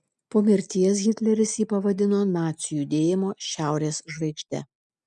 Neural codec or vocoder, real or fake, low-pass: none; real; 10.8 kHz